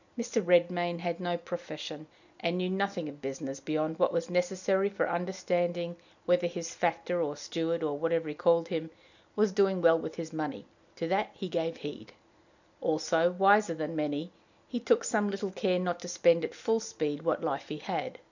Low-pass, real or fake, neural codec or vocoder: 7.2 kHz; real; none